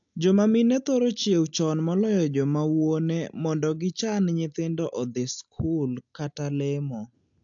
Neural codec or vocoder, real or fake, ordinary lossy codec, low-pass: none; real; AAC, 64 kbps; 7.2 kHz